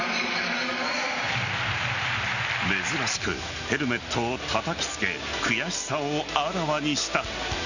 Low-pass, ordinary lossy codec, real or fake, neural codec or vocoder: 7.2 kHz; none; real; none